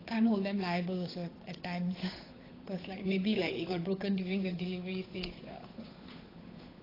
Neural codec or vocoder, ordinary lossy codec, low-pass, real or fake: codec, 16 kHz, 8 kbps, FunCodec, trained on Chinese and English, 25 frames a second; AAC, 24 kbps; 5.4 kHz; fake